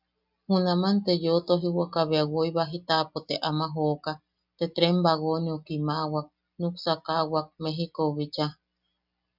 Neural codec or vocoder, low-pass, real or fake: none; 5.4 kHz; real